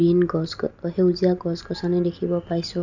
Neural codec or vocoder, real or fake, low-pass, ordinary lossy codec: none; real; 7.2 kHz; none